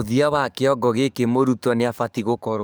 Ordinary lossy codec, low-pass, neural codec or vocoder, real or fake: none; none; codec, 44.1 kHz, 7.8 kbps, DAC; fake